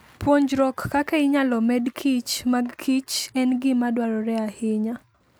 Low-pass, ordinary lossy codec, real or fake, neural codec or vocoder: none; none; real; none